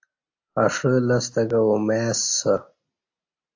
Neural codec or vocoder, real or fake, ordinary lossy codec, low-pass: none; real; AAC, 48 kbps; 7.2 kHz